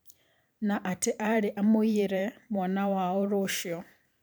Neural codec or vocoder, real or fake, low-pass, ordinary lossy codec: vocoder, 44.1 kHz, 128 mel bands every 512 samples, BigVGAN v2; fake; none; none